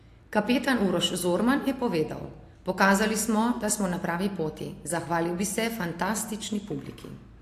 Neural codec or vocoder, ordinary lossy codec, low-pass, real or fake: none; AAC, 64 kbps; 14.4 kHz; real